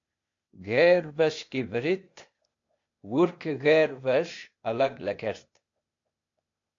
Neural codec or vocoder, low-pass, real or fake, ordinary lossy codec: codec, 16 kHz, 0.8 kbps, ZipCodec; 7.2 kHz; fake; AAC, 48 kbps